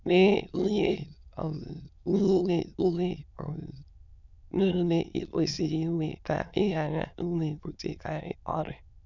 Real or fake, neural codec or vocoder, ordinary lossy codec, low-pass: fake; autoencoder, 22.05 kHz, a latent of 192 numbers a frame, VITS, trained on many speakers; none; 7.2 kHz